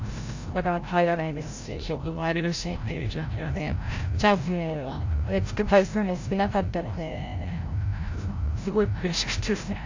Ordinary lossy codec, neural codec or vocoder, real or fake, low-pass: AAC, 48 kbps; codec, 16 kHz, 0.5 kbps, FreqCodec, larger model; fake; 7.2 kHz